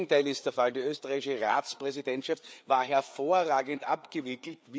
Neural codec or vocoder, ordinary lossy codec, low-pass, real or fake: codec, 16 kHz, 4 kbps, FreqCodec, larger model; none; none; fake